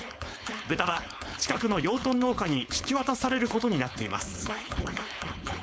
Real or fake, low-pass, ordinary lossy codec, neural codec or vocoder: fake; none; none; codec, 16 kHz, 4.8 kbps, FACodec